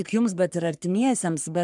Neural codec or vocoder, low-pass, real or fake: codec, 44.1 kHz, 3.4 kbps, Pupu-Codec; 10.8 kHz; fake